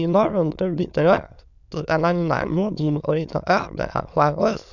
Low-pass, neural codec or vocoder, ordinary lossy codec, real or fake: 7.2 kHz; autoencoder, 22.05 kHz, a latent of 192 numbers a frame, VITS, trained on many speakers; none; fake